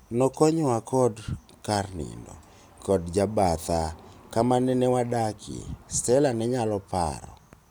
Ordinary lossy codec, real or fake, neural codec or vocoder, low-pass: none; real; none; none